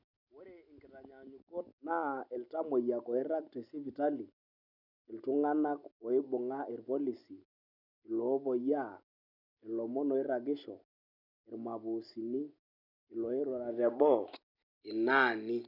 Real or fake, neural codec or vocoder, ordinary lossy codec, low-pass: real; none; none; 5.4 kHz